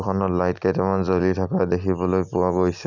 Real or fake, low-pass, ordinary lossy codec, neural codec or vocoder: real; 7.2 kHz; none; none